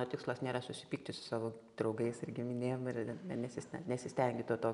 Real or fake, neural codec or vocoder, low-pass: real; none; 10.8 kHz